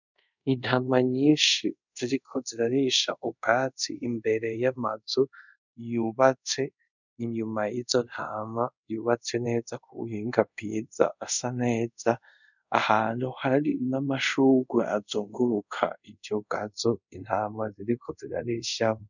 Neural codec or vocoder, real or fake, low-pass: codec, 24 kHz, 0.5 kbps, DualCodec; fake; 7.2 kHz